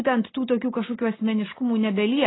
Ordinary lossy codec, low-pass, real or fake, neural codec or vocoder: AAC, 16 kbps; 7.2 kHz; real; none